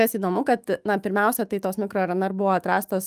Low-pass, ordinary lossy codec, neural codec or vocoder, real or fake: 14.4 kHz; Opus, 32 kbps; autoencoder, 48 kHz, 128 numbers a frame, DAC-VAE, trained on Japanese speech; fake